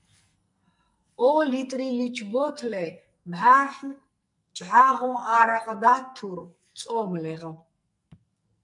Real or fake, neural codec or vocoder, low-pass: fake; codec, 44.1 kHz, 2.6 kbps, SNAC; 10.8 kHz